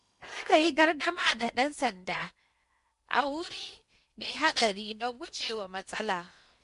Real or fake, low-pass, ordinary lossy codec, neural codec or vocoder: fake; 10.8 kHz; MP3, 64 kbps; codec, 16 kHz in and 24 kHz out, 0.8 kbps, FocalCodec, streaming, 65536 codes